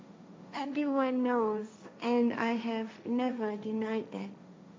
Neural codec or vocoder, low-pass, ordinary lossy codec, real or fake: codec, 16 kHz, 1.1 kbps, Voila-Tokenizer; none; none; fake